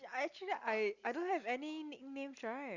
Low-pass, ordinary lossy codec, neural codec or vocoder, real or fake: 7.2 kHz; Opus, 64 kbps; none; real